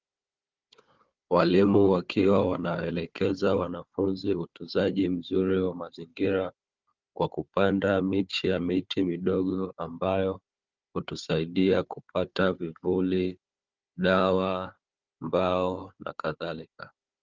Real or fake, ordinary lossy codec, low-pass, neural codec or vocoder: fake; Opus, 24 kbps; 7.2 kHz; codec, 16 kHz, 4 kbps, FunCodec, trained on Chinese and English, 50 frames a second